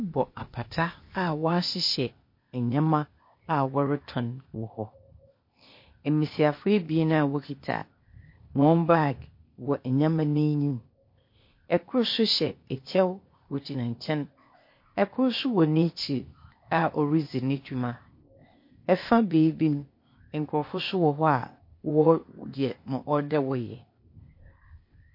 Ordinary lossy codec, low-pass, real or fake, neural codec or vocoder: MP3, 32 kbps; 5.4 kHz; fake; codec, 16 kHz, 0.8 kbps, ZipCodec